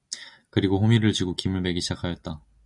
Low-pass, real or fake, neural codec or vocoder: 10.8 kHz; real; none